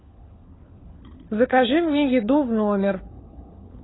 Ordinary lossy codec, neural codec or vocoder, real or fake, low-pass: AAC, 16 kbps; codec, 16 kHz, 4 kbps, FunCodec, trained on LibriTTS, 50 frames a second; fake; 7.2 kHz